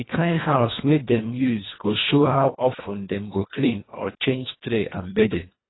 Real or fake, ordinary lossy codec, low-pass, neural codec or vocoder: fake; AAC, 16 kbps; 7.2 kHz; codec, 24 kHz, 1.5 kbps, HILCodec